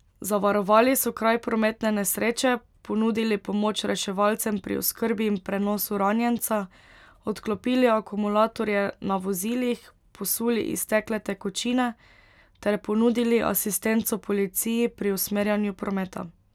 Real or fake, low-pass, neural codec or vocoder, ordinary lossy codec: real; 19.8 kHz; none; none